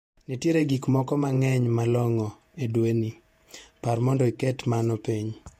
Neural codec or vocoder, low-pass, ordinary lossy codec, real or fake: vocoder, 48 kHz, 128 mel bands, Vocos; 19.8 kHz; MP3, 64 kbps; fake